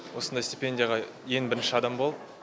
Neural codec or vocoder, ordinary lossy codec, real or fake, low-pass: none; none; real; none